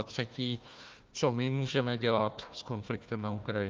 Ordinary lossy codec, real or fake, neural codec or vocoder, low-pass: Opus, 24 kbps; fake; codec, 16 kHz, 1 kbps, FunCodec, trained on Chinese and English, 50 frames a second; 7.2 kHz